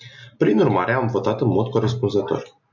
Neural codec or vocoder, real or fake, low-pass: none; real; 7.2 kHz